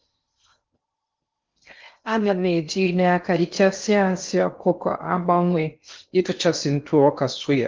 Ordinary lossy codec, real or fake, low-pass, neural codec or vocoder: Opus, 32 kbps; fake; 7.2 kHz; codec, 16 kHz in and 24 kHz out, 0.6 kbps, FocalCodec, streaming, 4096 codes